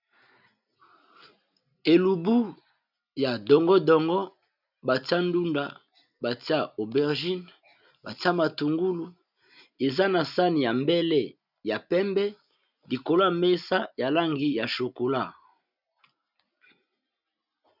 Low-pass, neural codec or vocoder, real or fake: 5.4 kHz; none; real